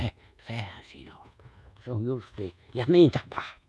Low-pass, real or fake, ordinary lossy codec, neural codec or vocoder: none; fake; none; codec, 24 kHz, 1.2 kbps, DualCodec